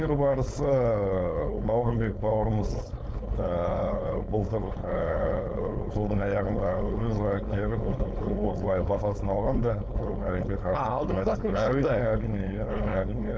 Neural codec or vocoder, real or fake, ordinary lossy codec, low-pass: codec, 16 kHz, 4.8 kbps, FACodec; fake; none; none